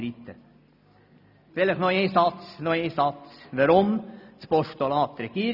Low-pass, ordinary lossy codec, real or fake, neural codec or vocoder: 5.4 kHz; none; real; none